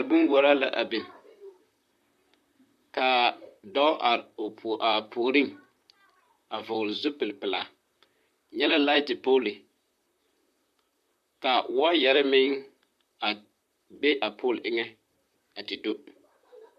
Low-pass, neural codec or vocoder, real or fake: 14.4 kHz; vocoder, 44.1 kHz, 128 mel bands, Pupu-Vocoder; fake